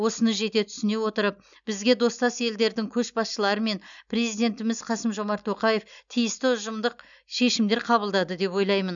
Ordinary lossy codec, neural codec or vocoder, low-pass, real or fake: none; none; 7.2 kHz; real